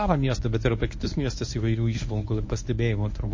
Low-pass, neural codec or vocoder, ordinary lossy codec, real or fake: 7.2 kHz; codec, 24 kHz, 0.9 kbps, WavTokenizer, medium speech release version 1; MP3, 32 kbps; fake